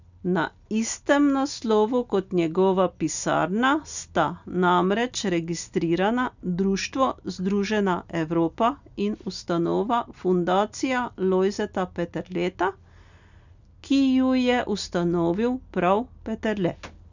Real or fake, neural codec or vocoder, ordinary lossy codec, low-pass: real; none; none; 7.2 kHz